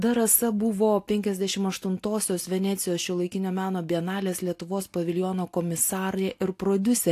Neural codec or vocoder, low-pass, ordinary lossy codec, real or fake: none; 14.4 kHz; AAC, 64 kbps; real